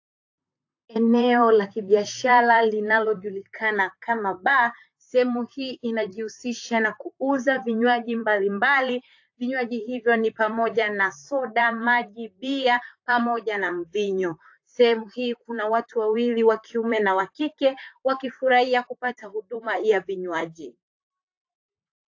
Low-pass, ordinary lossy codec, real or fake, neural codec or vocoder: 7.2 kHz; AAC, 48 kbps; fake; vocoder, 44.1 kHz, 128 mel bands, Pupu-Vocoder